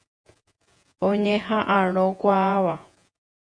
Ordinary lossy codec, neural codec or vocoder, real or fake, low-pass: MP3, 48 kbps; vocoder, 48 kHz, 128 mel bands, Vocos; fake; 9.9 kHz